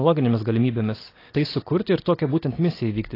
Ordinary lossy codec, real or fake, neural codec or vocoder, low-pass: AAC, 24 kbps; real; none; 5.4 kHz